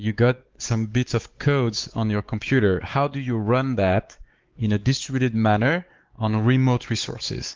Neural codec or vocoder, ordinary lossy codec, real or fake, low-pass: codec, 16 kHz, 4 kbps, X-Codec, WavLM features, trained on Multilingual LibriSpeech; Opus, 16 kbps; fake; 7.2 kHz